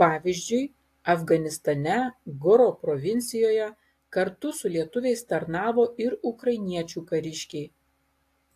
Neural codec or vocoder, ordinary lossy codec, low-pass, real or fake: none; AAC, 64 kbps; 14.4 kHz; real